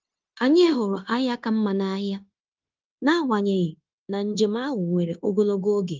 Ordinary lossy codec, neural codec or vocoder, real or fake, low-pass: Opus, 32 kbps; codec, 16 kHz, 0.9 kbps, LongCat-Audio-Codec; fake; 7.2 kHz